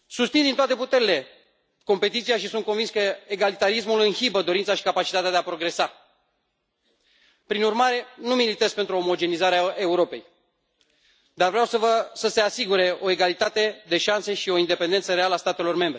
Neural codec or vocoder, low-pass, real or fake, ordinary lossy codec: none; none; real; none